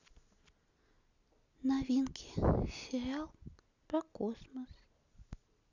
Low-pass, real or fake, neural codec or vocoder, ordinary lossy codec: 7.2 kHz; real; none; none